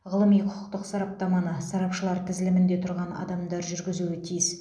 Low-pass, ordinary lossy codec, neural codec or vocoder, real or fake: none; none; none; real